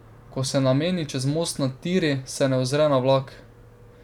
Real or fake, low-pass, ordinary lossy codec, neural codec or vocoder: fake; 19.8 kHz; none; vocoder, 44.1 kHz, 128 mel bands every 256 samples, BigVGAN v2